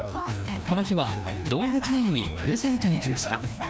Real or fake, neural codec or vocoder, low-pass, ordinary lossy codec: fake; codec, 16 kHz, 1 kbps, FreqCodec, larger model; none; none